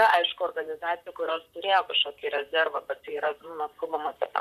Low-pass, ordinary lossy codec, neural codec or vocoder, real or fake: 14.4 kHz; AAC, 96 kbps; vocoder, 44.1 kHz, 128 mel bands, Pupu-Vocoder; fake